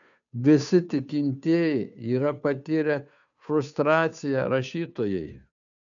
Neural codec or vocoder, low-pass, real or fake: codec, 16 kHz, 2 kbps, FunCodec, trained on Chinese and English, 25 frames a second; 7.2 kHz; fake